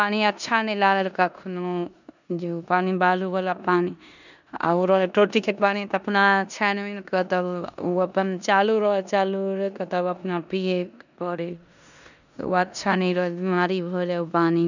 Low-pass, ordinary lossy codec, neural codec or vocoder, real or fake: 7.2 kHz; none; codec, 16 kHz in and 24 kHz out, 0.9 kbps, LongCat-Audio-Codec, four codebook decoder; fake